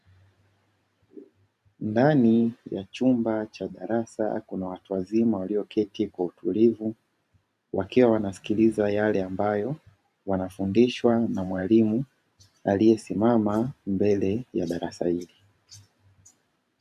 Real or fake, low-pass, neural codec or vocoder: fake; 14.4 kHz; vocoder, 44.1 kHz, 128 mel bands every 512 samples, BigVGAN v2